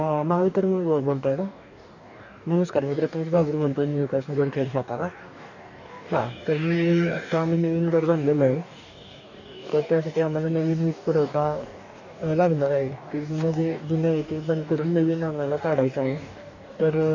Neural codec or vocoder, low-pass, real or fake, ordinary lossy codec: codec, 44.1 kHz, 2.6 kbps, DAC; 7.2 kHz; fake; none